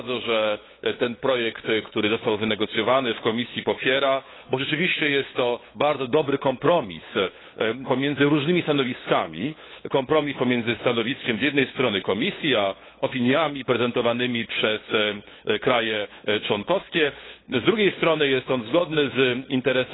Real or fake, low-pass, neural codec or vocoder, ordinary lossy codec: fake; 7.2 kHz; codec, 16 kHz, 8 kbps, FunCodec, trained on LibriTTS, 25 frames a second; AAC, 16 kbps